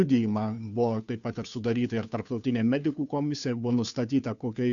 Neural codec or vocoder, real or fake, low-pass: codec, 16 kHz, 2 kbps, FunCodec, trained on Chinese and English, 25 frames a second; fake; 7.2 kHz